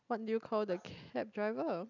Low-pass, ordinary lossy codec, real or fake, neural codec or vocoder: 7.2 kHz; none; real; none